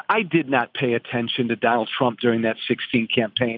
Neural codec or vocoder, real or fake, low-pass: none; real; 5.4 kHz